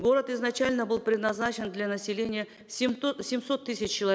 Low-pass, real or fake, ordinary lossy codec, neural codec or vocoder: none; real; none; none